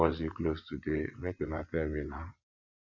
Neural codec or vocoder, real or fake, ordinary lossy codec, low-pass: vocoder, 24 kHz, 100 mel bands, Vocos; fake; AAC, 48 kbps; 7.2 kHz